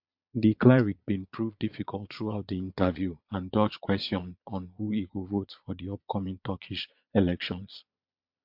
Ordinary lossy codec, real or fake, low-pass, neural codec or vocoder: MP3, 48 kbps; fake; 5.4 kHz; vocoder, 22.05 kHz, 80 mel bands, Vocos